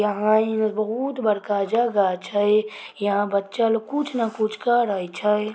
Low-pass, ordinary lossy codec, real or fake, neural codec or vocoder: none; none; real; none